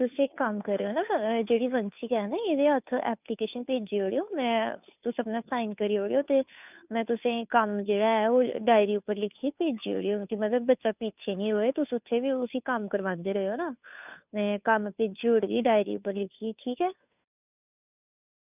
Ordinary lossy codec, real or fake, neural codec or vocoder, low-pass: none; fake; codec, 16 kHz, 2 kbps, FunCodec, trained on Chinese and English, 25 frames a second; 3.6 kHz